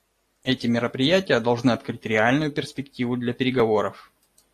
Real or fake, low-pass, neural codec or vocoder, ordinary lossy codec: real; 14.4 kHz; none; AAC, 48 kbps